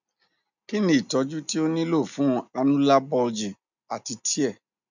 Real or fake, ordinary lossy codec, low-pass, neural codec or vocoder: real; none; 7.2 kHz; none